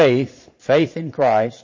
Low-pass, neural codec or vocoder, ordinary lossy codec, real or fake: 7.2 kHz; vocoder, 22.05 kHz, 80 mel bands, Vocos; MP3, 32 kbps; fake